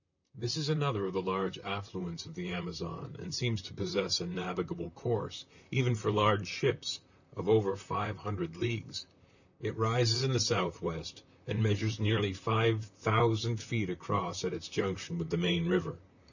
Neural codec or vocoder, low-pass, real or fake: vocoder, 44.1 kHz, 128 mel bands, Pupu-Vocoder; 7.2 kHz; fake